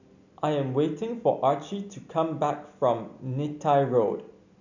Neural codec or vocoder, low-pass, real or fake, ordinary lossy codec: none; 7.2 kHz; real; none